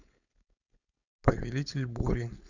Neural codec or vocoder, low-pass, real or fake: codec, 16 kHz, 4.8 kbps, FACodec; 7.2 kHz; fake